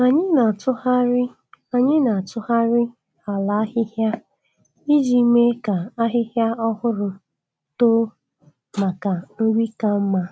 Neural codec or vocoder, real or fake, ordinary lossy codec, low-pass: none; real; none; none